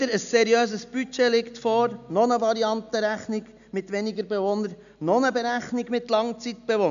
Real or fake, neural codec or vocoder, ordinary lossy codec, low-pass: real; none; none; 7.2 kHz